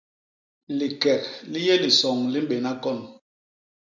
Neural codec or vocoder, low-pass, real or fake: none; 7.2 kHz; real